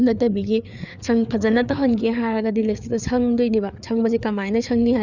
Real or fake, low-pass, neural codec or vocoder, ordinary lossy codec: fake; 7.2 kHz; codec, 16 kHz, 4 kbps, FreqCodec, larger model; none